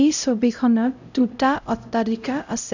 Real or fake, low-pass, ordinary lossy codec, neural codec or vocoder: fake; 7.2 kHz; none; codec, 16 kHz, 0.5 kbps, X-Codec, HuBERT features, trained on LibriSpeech